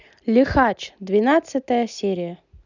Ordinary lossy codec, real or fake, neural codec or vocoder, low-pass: none; real; none; 7.2 kHz